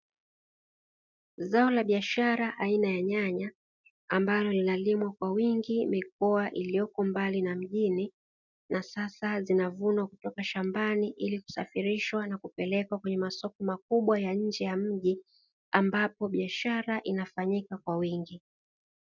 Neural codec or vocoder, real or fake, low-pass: none; real; 7.2 kHz